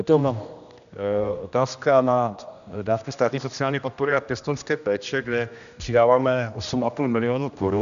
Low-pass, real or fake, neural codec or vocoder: 7.2 kHz; fake; codec, 16 kHz, 1 kbps, X-Codec, HuBERT features, trained on general audio